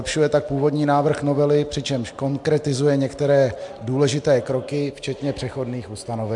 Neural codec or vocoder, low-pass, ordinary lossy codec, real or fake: none; 10.8 kHz; MP3, 64 kbps; real